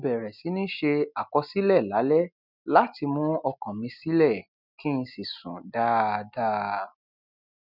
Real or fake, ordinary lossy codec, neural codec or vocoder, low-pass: real; none; none; 5.4 kHz